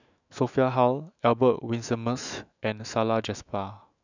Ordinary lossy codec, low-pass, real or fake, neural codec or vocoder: none; 7.2 kHz; fake; autoencoder, 48 kHz, 128 numbers a frame, DAC-VAE, trained on Japanese speech